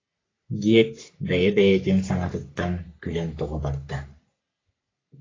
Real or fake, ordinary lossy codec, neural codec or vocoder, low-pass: fake; AAC, 32 kbps; codec, 44.1 kHz, 3.4 kbps, Pupu-Codec; 7.2 kHz